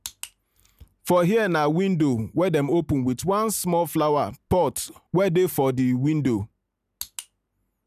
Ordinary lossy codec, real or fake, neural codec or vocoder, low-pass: none; real; none; 14.4 kHz